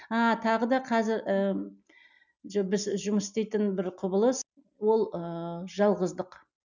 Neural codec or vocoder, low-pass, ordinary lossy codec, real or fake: none; 7.2 kHz; none; real